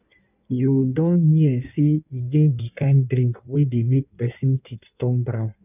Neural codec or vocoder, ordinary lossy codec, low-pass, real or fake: codec, 16 kHz in and 24 kHz out, 1.1 kbps, FireRedTTS-2 codec; none; 3.6 kHz; fake